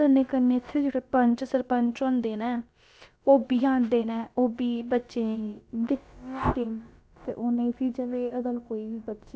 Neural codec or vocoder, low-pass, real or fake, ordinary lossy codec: codec, 16 kHz, about 1 kbps, DyCAST, with the encoder's durations; none; fake; none